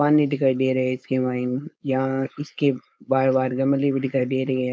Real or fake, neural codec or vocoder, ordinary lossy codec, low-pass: fake; codec, 16 kHz, 4.8 kbps, FACodec; none; none